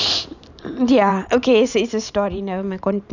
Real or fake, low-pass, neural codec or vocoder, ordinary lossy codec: fake; 7.2 kHz; vocoder, 44.1 kHz, 128 mel bands every 512 samples, BigVGAN v2; none